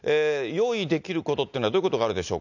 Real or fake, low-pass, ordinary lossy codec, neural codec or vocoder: real; 7.2 kHz; none; none